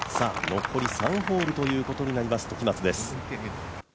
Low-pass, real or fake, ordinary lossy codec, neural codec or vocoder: none; real; none; none